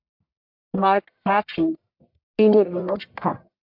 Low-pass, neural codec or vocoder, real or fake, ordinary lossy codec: 5.4 kHz; codec, 44.1 kHz, 1.7 kbps, Pupu-Codec; fake; MP3, 48 kbps